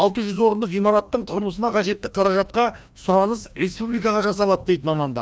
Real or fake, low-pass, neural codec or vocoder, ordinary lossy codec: fake; none; codec, 16 kHz, 1 kbps, FreqCodec, larger model; none